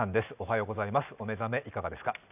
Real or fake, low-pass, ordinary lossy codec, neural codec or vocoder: real; 3.6 kHz; none; none